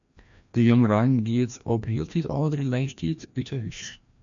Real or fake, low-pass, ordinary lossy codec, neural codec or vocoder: fake; 7.2 kHz; MP3, 64 kbps; codec, 16 kHz, 1 kbps, FreqCodec, larger model